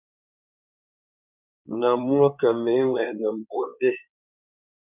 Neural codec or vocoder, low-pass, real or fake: codec, 16 kHz in and 24 kHz out, 2.2 kbps, FireRedTTS-2 codec; 3.6 kHz; fake